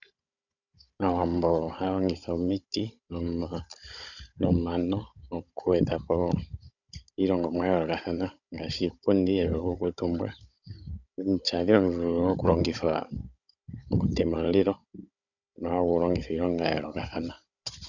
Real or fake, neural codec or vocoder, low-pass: fake; codec, 16 kHz, 16 kbps, FunCodec, trained on Chinese and English, 50 frames a second; 7.2 kHz